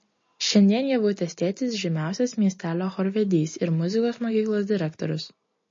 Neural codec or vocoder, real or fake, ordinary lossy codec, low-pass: none; real; MP3, 32 kbps; 7.2 kHz